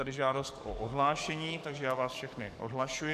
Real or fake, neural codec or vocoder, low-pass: fake; codec, 44.1 kHz, 7.8 kbps, DAC; 14.4 kHz